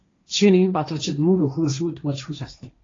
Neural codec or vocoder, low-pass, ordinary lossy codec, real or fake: codec, 16 kHz, 1.1 kbps, Voila-Tokenizer; 7.2 kHz; AAC, 32 kbps; fake